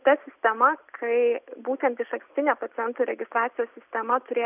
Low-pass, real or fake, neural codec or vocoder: 3.6 kHz; real; none